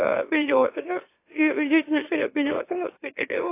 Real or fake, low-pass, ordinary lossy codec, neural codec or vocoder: fake; 3.6 kHz; AAC, 24 kbps; autoencoder, 44.1 kHz, a latent of 192 numbers a frame, MeloTTS